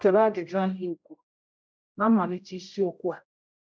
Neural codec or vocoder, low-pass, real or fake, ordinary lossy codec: codec, 16 kHz, 0.5 kbps, X-Codec, HuBERT features, trained on general audio; none; fake; none